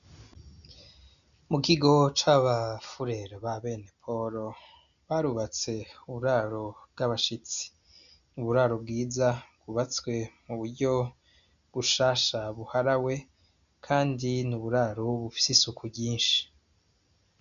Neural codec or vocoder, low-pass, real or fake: none; 7.2 kHz; real